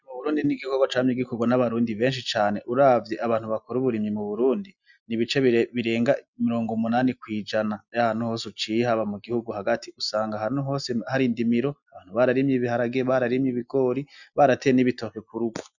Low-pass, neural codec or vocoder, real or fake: 7.2 kHz; none; real